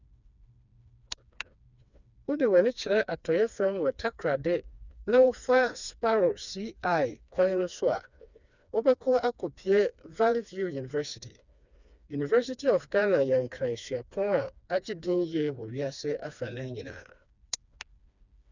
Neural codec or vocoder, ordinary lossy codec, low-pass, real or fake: codec, 16 kHz, 2 kbps, FreqCodec, smaller model; none; 7.2 kHz; fake